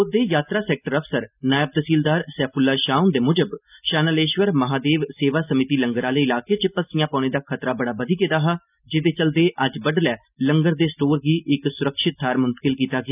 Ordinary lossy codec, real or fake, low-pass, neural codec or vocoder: none; real; 3.6 kHz; none